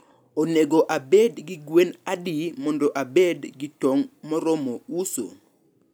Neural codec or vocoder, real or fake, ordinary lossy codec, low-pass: none; real; none; none